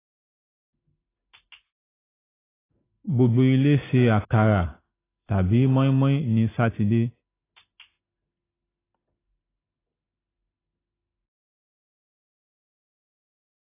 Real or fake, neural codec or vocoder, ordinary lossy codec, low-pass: real; none; AAC, 16 kbps; 3.6 kHz